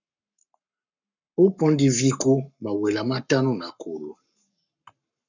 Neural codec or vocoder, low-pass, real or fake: codec, 44.1 kHz, 7.8 kbps, Pupu-Codec; 7.2 kHz; fake